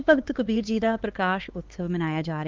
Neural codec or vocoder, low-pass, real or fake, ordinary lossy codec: codec, 16 kHz, 4 kbps, X-Codec, HuBERT features, trained on LibriSpeech; 7.2 kHz; fake; Opus, 16 kbps